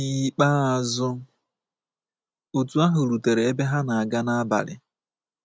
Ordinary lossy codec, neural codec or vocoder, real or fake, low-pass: none; none; real; none